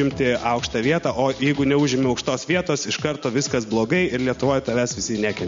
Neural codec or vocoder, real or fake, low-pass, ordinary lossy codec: none; real; 7.2 kHz; MP3, 48 kbps